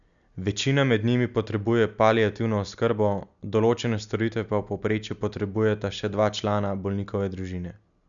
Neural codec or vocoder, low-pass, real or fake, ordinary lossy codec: none; 7.2 kHz; real; none